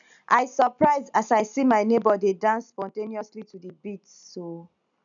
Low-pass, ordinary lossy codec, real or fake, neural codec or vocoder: 7.2 kHz; none; real; none